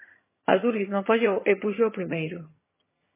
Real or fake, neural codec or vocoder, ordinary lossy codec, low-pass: fake; vocoder, 22.05 kHz, 80 mel bands, HiFi-GAN; MP3, 16 kbps; 3.6 kHz